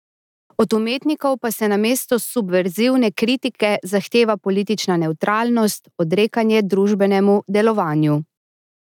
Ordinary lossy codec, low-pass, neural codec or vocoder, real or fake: none; 19.8 kHz; none; real